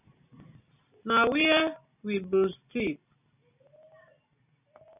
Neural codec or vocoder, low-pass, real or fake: none; 3.6 kHz; real